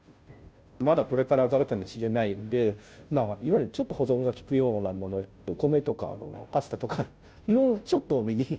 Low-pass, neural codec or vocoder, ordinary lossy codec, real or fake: none; codec, 16 kHz, 0.5 kbps, FunCodec, trained on Chinese and English, 25 frames a second; none; fake